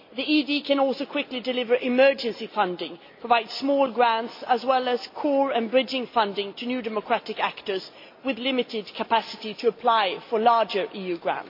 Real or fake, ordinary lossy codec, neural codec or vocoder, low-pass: real; MP3, 32 kbps; none; 5.4 kHz